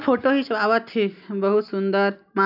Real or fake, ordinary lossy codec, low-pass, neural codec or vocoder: real; none; 5.4 kHz; none